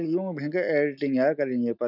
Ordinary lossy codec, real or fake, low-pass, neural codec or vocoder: none; real; 5.4 kHz; none